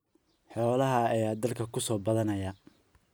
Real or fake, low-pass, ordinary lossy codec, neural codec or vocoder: real; none; none; none